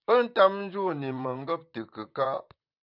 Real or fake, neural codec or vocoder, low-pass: fake; vocoder, 44.1 kHz, 128 mel bands, Pupu-Vocoder; 5.4 kHz